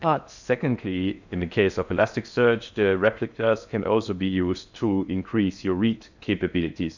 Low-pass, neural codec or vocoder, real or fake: 7.2 kHz; codec, 16 kHz in and 24 kHz out, 0.6 kbps, FocalCodec, streaming, 2048 codes; fake